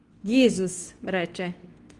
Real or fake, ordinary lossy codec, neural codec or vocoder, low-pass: fake; Opus, 24 kbps; codec, 24 kHz, 0.9 kbps, WavTokenizer, medium speech release version 2; 10.8 kHz